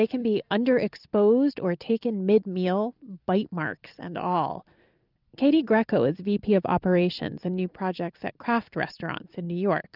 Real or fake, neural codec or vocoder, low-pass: fake; vocoder, 44.1 kHz, 128 mel bands every 256 samples, BigVGAN v2; 5.4 kHz